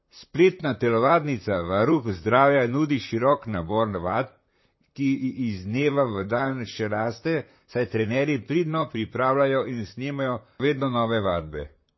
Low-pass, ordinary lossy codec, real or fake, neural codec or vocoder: 7.2 kHz; MP3, 24 kbps; fake; vocoder, 44.1 kHz, 128 mel bands, Pupu-Vocoder